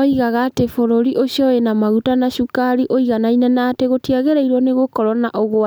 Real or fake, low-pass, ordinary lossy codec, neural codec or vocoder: real; none; none; none